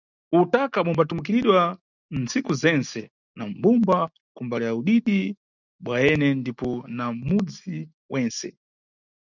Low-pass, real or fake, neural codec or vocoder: 7.2 kHz; real; none